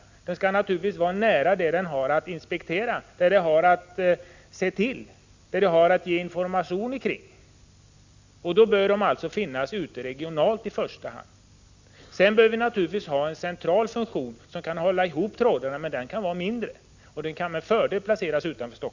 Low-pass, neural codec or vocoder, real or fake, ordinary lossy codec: 7.2 kHz; none; real; Opus, 64 kbps